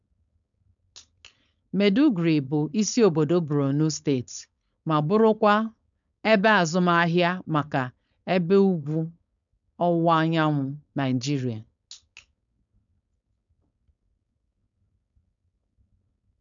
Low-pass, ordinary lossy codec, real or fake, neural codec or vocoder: 7.2 kHz; none; fake; codec, 16 kHz, 4.8 kbps, FACodec